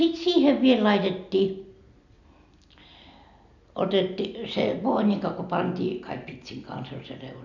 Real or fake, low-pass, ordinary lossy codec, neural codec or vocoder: real; 7.2 kHz; none; none